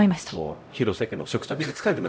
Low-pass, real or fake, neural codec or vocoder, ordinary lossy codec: none; fake; codec, 16 kHz, 0.5 kbps, X-Codec, HuBERT features, trained on LibriSpeech; none